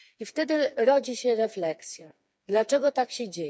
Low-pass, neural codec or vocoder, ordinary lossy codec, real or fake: none; codec, 16 kHz, 4 kbps, FreqCodec, smaller model; none; fake